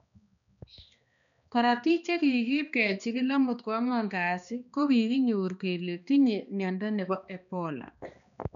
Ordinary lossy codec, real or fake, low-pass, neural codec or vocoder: none; fake; 7.2 kHz; codec, 16 kHz, 2 kbps, X-Codec, HuBERT features, trained on balanced general audio